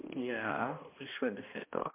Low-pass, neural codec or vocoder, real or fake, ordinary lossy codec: 3.6 kHz; codec, 16 kHz, 1 kbps, X-Codec, HuBERT features, trained on balanced general audio; fake; MP3, 24 kbps